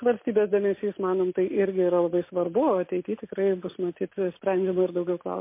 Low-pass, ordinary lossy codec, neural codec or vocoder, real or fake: 3.6 kHz; MP3, 24 kbps; none; real